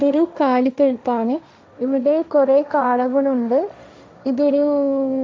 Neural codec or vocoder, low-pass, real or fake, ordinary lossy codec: codec, 16 kHz, 1.1 kbps, Voila-Tokenizer; none; fake; none